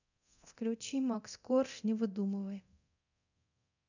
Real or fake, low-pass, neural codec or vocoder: fake; 7.2 kHz; codec, 24 kHz, 0.9 kbps, DualCodec